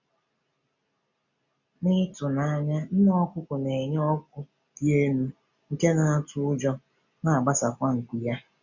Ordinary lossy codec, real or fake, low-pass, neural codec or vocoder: none; real; 7.2 kHz; none